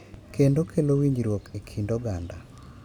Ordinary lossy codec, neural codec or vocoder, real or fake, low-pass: none; none; real; 19.8 kHz